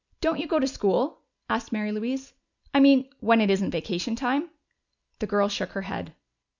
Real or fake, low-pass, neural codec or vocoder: real; 7.2 kHz; none